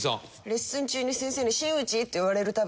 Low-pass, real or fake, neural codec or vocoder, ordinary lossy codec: none; real; none; none